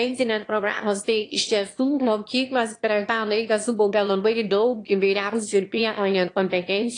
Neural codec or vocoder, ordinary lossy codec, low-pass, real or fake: autoencoder, 22.05 kHz, a latent of 192 numbers a frame, VITS, trained on one speaker; AAC, 48 kbps; 9.9 kHz; fake